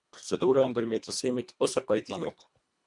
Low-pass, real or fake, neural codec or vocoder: 10.8 kHz; fake; codec, 24 kHz, 1.5 kbps, HILCodec